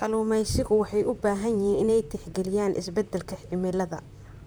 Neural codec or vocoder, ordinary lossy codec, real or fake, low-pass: vocoder, 44.1 kHz, 128 mel bands, Pupu-Vocoder; none; fake; none